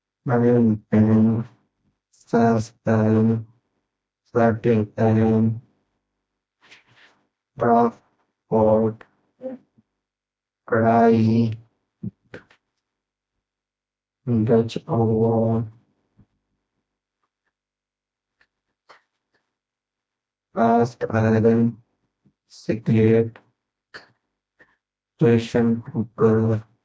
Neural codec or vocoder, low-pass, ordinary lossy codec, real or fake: codec, 16 kHz, 1 kbps, FreqCodec, smaller model; none; none; fake